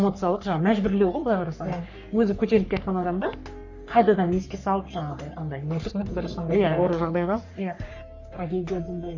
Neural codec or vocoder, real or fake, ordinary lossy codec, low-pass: codec, 44.1 kHz, 3.4 kbps, Pupu-Codec; fake; none; 7.2 kHz